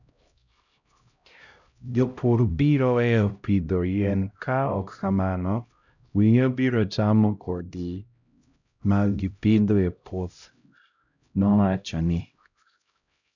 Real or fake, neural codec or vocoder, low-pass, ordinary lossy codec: fake; codec, 16 kHz, 0.5 kbps, X-Codec, HuBERT features, trained on LibriSpeech; 7.2 kHz; none